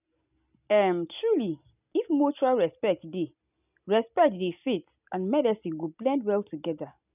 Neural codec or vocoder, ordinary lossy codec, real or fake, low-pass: none; none; real; 3.6 kHz